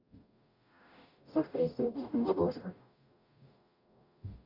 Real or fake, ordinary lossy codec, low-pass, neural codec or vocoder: fake; none; 5.4 kHz; codec, 44.1 kHz, 0.9 kbps, DAC